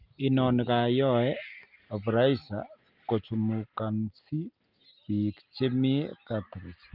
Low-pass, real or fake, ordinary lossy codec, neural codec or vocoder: 5.4 kHz; real; Opus, 24 kbps; none